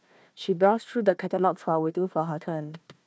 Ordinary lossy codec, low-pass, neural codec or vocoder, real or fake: none; none; codec, 16 kHz, 1 kbps, FunCodec, trained on Chinese and English, 50 frames a second; fake